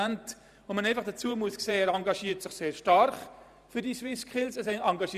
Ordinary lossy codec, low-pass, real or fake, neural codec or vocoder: none; 14.4 kHz; fake; vocoder, 44.1 kHz, 128 mel bands every 512 samples, BigVGAN v2